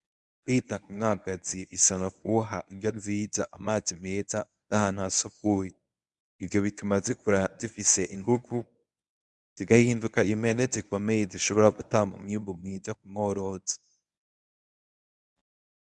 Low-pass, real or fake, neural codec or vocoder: 10.8 kHz; fake; codec, 24 kHz, 0.9 kbps, WavTokenizer, medium speech release version 1